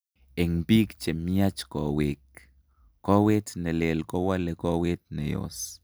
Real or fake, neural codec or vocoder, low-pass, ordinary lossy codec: real; none; none; none